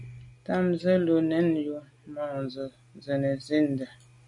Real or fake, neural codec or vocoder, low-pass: real; none; 10.8 kHz